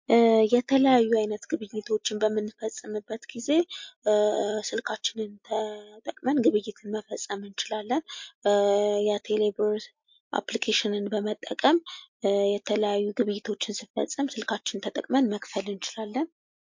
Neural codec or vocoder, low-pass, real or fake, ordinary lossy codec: none; 7.2 kHz; real; MP3, 48 kbps